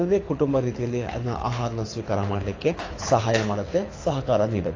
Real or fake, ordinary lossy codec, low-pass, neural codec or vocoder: fake; AAC, 32 kbps; 7.2 kHz; codec, 24 kHz, 6 kbps, HILCodec